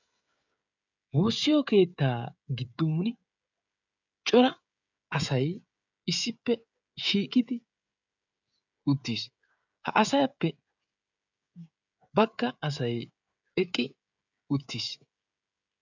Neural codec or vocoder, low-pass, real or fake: codec, 16 kHz, 16 kbps, FreqCodec, smaller model; 7.2 kHz; fake